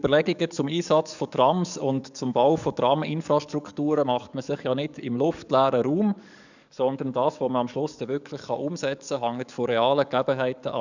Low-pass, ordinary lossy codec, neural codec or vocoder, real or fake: 7.2 kHz; none; codec, 44.1 kHz, 7.8 kbps, DAC; fake